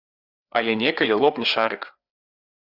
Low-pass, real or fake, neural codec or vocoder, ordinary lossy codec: 5.4 kHz; fake; codec, 16 kHz, 4 kbps, FreqCodec, larger model; Opus, 64 kbps